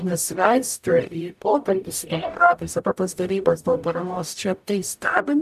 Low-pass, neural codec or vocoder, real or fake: 14.4 kHz; codec, 44.1 kHz, 0.9 kbps, DAC; fake